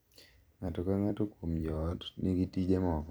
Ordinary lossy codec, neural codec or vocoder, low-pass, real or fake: none; vocoder, 44.1 kHz, 128 mel bands every 256 samples, BigVGAN v2; none; fake